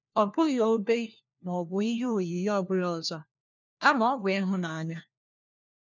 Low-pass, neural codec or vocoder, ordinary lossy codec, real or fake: 7.2 kHz; codec, 16 kHz, 1 kbps, FunCodec, trained on LibriTTS, 50 frames a second; none; fake